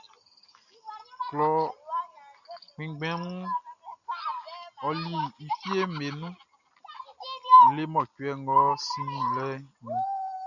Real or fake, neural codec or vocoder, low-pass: real; none; 7.2 kHz